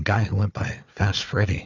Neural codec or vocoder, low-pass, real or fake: codec, 16 kHz, 4 kbps, FunCodec, trained on Chinese and English, 50 frames a second; 7.2 kHz; fake